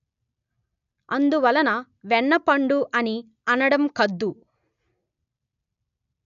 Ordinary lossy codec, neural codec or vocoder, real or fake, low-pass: none; none; real; 7.2 kHz